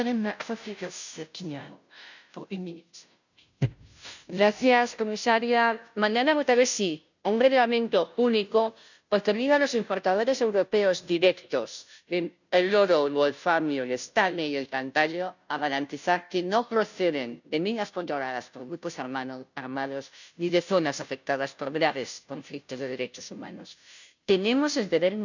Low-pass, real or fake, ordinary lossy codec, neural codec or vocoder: 7.2 kHz; fake; none; codec, 16 kHz, 0.5 kbps, FunCodec, trained on Chinese and English, 25 frames a second